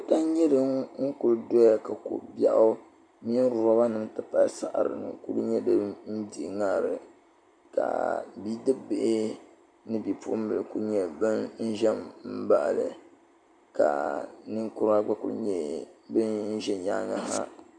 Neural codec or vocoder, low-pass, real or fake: none; 9.9 kHz; real